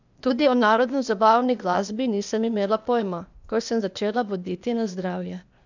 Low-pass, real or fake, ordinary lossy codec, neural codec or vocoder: 7.2 kHz; fake; none; codec, 16 kHz, 0.8 kbps, ZipCodec